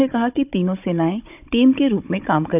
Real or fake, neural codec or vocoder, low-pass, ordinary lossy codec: fake; codec, 16 kHz, 16 kbps, FunCodec, trained on Chinese and English, 50 frames a second; 3.6 kHz; none